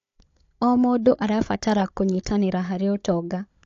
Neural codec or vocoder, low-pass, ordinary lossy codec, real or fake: codec, 16 kHz, 16 kbps, FunCodec, trained on Chinese and English, 50 frames a second; 7.2 kHz; AAC, 48 kbps; fake